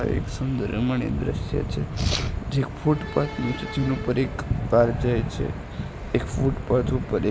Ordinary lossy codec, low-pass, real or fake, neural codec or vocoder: none; none; real; none